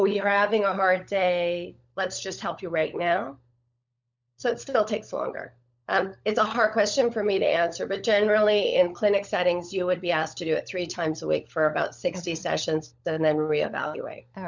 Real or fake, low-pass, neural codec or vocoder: fake; 7.2 kHz; codec, 16 kHz, 16 kbps, FunCodec, trained on LibriTTS, 50 frames a second